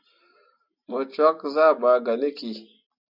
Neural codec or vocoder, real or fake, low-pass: none; real; 5.4 kHz